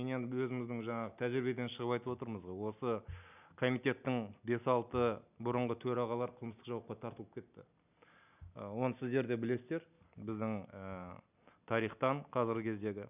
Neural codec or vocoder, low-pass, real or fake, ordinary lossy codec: none; 3.6 kHz; real; none